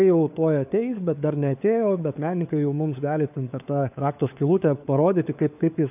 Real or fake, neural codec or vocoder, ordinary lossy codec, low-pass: fake; codec, 16 kHz, 4 kbps, FunCodec, trained on LibriTTS, 50 frames a second; AAC, 32 kbps; 3.6 kHz